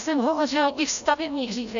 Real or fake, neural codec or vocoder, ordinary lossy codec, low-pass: fake; codec, 16 kHz, 0.5 kbps, FreqCodec, larger model; AAC, 64 kbps; 7.2 kHz